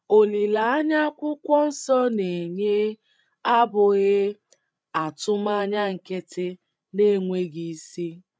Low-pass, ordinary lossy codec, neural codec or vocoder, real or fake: none; none; codec, 16 kHz, 16 kbps, FreqCodec, larger model; fake